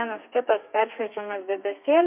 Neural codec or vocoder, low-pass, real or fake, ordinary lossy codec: codec, 32 kHz, 1.9 kbps, SNAC; 3.6 kHz; fake; MP3, 32 kbps